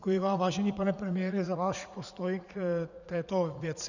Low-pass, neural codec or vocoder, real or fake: 7.2 kHz; vocoder, 44.1 kHz, 80 mel bands, Vocos; fake